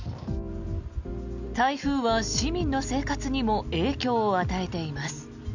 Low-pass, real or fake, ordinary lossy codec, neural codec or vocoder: 7.2 kHz; real; none; none